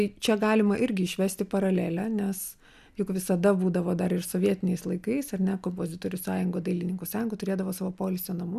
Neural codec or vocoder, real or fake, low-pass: none; real; 14.4 kHz